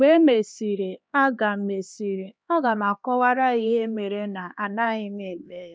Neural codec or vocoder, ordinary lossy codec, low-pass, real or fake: codec, 16 kHz, 2 kbps, X-Codec, HuBERT features, trained on LibriSpeech; none; none; fake